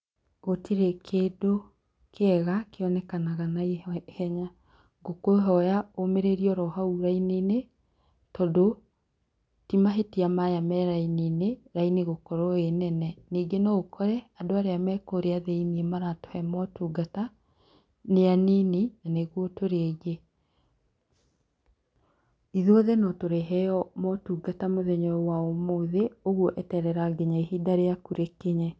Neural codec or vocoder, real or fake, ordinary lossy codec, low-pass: none; real; none; none